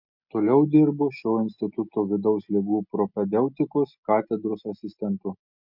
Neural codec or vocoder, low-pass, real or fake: none; 5.4 kHz; real